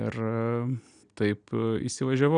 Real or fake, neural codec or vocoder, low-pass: real; none; 9.9 kHz